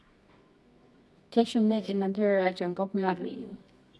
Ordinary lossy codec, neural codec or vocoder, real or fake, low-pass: none; codec, 24 kHz, 0.9 kbps, WavTokenizer, medium music audio release; fake; none